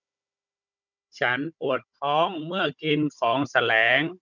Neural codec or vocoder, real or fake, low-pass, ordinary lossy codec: codec, 16 kHz, 16 kbps, FunCodec, trained on Chinese and English, 50 frames a second; fake; 7.2 kHz; none